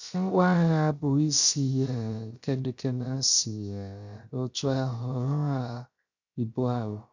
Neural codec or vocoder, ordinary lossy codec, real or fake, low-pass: codec, 16 kHz, 0.3 kbps, FocalCodec; none; fake; 7.2 kHz